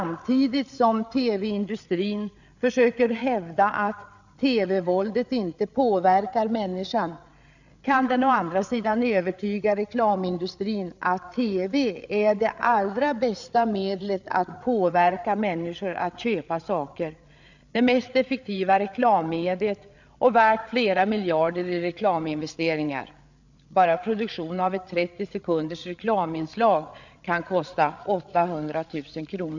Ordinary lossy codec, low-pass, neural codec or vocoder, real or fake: none; 7.2 kHz; codec, 16 kHz, 8 kbps, FreqCodec, larger model; fake